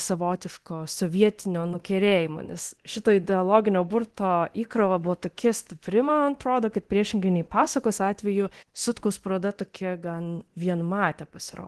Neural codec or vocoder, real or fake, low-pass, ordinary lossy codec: codec, 24 kHz, 0.9 kbps, DualCodec; fake; 10.8 kHz; Opus, 16 kbps